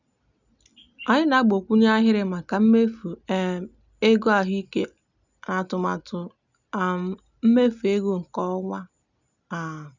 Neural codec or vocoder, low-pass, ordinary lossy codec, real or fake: none; 7.2 kHz; none; real